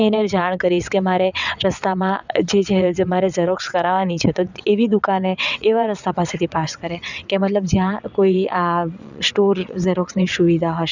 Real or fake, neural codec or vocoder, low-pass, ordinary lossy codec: fake; vocoder, 22.05 kHz, 80 mel bands, WaveNeXt; 7.2 kHz; none